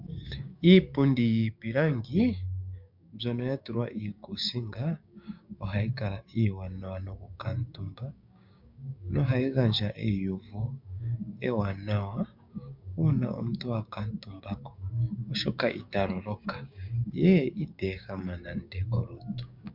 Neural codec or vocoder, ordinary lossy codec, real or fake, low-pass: codec, 16 kHz, 6 kbps, DAC; MP3, 48 kbps; fake; 5.4 kHz